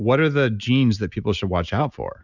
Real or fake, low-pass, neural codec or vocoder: real; 7.2 kHz; none